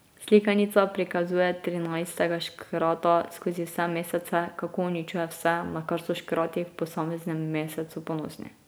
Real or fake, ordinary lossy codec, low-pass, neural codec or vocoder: real; none; none; none